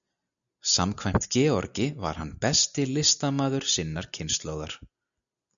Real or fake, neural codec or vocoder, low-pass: real; none; 7.2 kHz